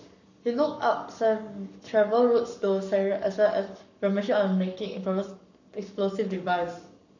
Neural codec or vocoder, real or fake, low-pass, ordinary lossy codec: codec, 44.1 kHz, 7.8 kbps, Pupu-Codec; fake; 7.2 kHz; none